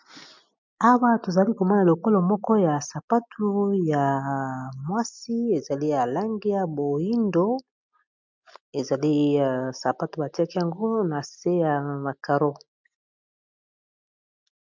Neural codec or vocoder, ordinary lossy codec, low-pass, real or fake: none; MP3, 64 kbps; 7.2 kHz; real